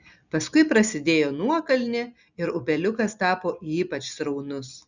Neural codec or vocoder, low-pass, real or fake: none; 7.2 kHz; real